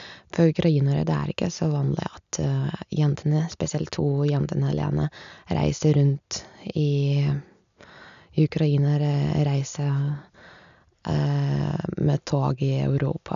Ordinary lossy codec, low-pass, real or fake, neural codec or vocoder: none; 7.2 kHz; real; none